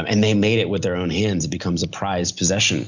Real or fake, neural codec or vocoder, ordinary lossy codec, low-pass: real; none; Opus, 64 kbps; 7.2 kHz